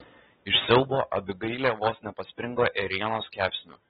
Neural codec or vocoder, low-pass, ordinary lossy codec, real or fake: none; 7.2 kHz; AAC, 16 kbps; real